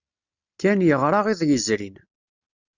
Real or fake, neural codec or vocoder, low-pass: real; none; 7.2 kHz